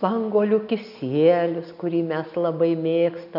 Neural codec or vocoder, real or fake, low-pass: none; real; 5.4 kHz